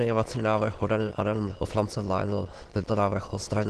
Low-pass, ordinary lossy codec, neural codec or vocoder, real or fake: 9.9 kHz; Opus, 16 kbps; autoencoder, 22.05 kHz, a latent of 192 numbers a frame, VITS, trained on many speakers; fake